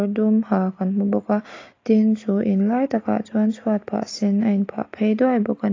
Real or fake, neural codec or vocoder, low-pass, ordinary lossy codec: real; none; 7.2 kHz; AAC, 32 kbps